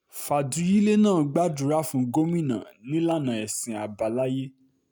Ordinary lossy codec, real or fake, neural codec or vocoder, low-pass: none; real; none; none